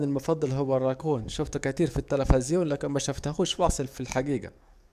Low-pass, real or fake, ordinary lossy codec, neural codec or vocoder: 14.4 kHz; fake; none; codec, 44.1 kHz, 7.8 kbps, DAC